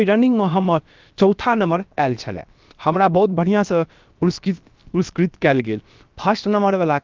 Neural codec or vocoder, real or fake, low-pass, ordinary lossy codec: codec, 16 kHz, about 1 kbps, DyCAST, with the encoder's durations; fake; 7.2 kHz; Opus, 24 kbps